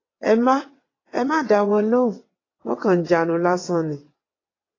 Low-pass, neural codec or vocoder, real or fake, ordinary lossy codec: 7.2 kHz; vocoder, 22.05 kHz, 80 mel bands, WaveNeXt; fake; AAC, 32 kbps